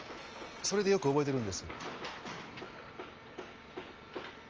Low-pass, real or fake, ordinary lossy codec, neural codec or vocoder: 7.2 kHz; real; Opus, 24 kbps; none